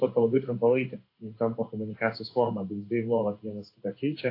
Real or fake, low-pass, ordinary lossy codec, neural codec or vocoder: fake; 5.4 kHz; AAC, 32 kbps; codec, 16 kHz in and 24 kHz out, 1 kbps, XY-Tokenizer